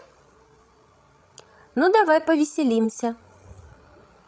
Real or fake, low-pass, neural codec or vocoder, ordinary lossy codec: fake; none; codec, 16 kHz, 16 kbps, FreqCodec, larger model; none